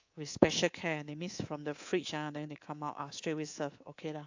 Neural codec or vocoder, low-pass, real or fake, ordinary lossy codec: codec, 24 kHz, 3.1 kbps, DualCodec; 7.2 kHz; fake; AAC, 48 kbps